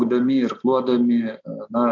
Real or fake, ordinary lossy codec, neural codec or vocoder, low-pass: real; MP3, 64 kbps; none; 7.2 kHz